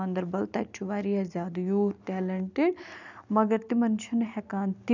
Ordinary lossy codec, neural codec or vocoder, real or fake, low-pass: none; none; real; 7.2 kHz